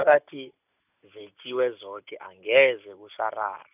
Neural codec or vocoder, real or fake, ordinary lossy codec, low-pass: none; real; none; 3.6 kHz